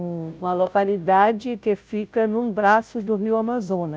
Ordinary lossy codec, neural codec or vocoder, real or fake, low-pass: none; codec, 16 kHz, 0.5 kbps, FunCodec, trained on Chinese and English, 25 frames a second; fake; none